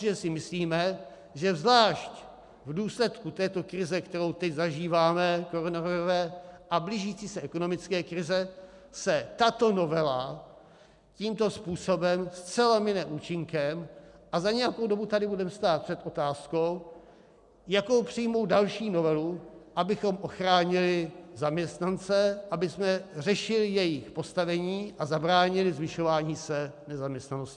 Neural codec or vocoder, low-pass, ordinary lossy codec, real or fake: none; 10.8 kHz; MP3, 96 kbps; real